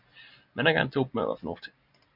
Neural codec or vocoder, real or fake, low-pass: none; real; 5.4 kHz